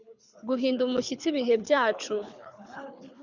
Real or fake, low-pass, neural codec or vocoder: fake; 7.2 kHz; codec, 24 kHz, 6 kbps, HILCodec